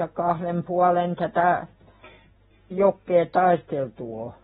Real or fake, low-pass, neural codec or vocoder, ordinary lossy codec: real; 19.8 kHz; none; AAC, 16 kbps